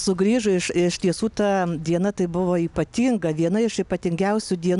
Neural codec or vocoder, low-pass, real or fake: none; 10.8 kHz; real